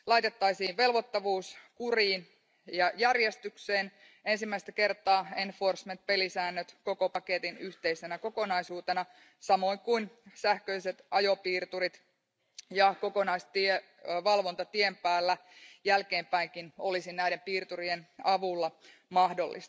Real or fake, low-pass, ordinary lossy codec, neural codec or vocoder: real; none; none; none